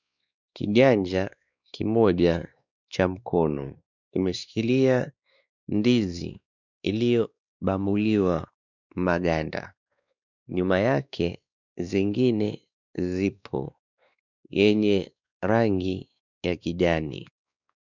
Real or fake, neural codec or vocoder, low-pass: fake; codec, 16 kHz, 2 kbps, X-Codec, WavLM features, trained on Multilingual LibriSpeech; 7.2 kHz